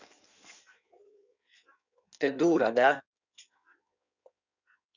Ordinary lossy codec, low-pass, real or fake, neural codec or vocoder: none; 7.2 kHz; fake; codec, 16 kHz in and 24 kHz out, 1.1 kbps, FireRedTTS-2 codec